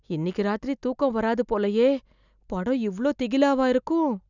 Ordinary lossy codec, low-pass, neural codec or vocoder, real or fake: none; 7.2 kHz; none; real